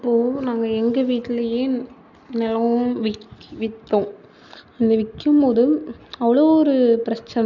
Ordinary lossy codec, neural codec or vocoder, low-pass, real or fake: none; none; 7.2 kHz; real